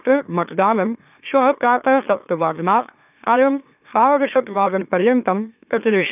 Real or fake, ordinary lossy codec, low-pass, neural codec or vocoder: fake; none; 3.6 kHz; autoencoder, 44.1 kHz, a latent of 192 numbers a frame, MeloTTS